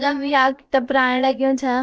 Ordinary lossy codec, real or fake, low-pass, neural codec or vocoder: none; fake; none; codec, 16 kHz, about 1 kbps, DyCAST, with the encoder's durations